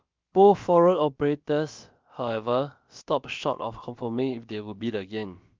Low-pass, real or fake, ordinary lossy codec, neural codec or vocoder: 7.2 kHz; fake; Opus, 24 kbps; codec, 16 kHz, about 1 kbps, DyCAST, with the encoder's durations